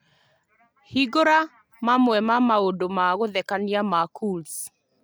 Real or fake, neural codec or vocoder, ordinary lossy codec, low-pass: real; none; none; none